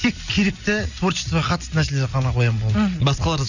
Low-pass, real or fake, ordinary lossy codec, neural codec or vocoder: 7.2 kHz; real; none; none